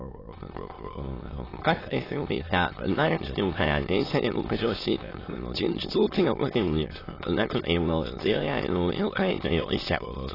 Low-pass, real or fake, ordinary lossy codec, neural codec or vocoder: 5.4 kHz; fake; AAC, 24 kbps; autoencoder, 22.05 kHz, a latent of 192 numbers a frame, VITS, trained on many speakers